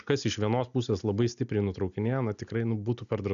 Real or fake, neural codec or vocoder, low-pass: real; none; 7.2 kHz